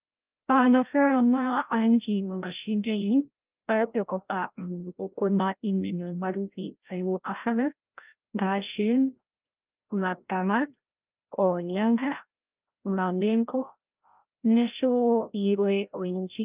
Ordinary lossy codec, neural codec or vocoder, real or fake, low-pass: Opus, 24 kbps; codec, 16 kHz, 0.5 kbps, FreqCodec, larger model; fake; 3.6 kHz